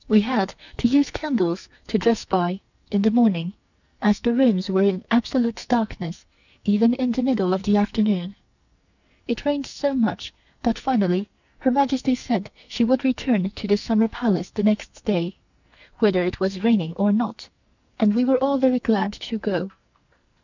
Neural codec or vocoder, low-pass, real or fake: codec, 44.1 kHz, 2.6 kbps, SNAC; 7.2 kHz; fake